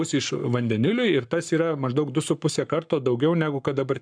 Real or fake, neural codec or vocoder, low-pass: fake; codec, 44.1 kHz, 7.8 kbps, Pupu-Codec; 9.9 kHz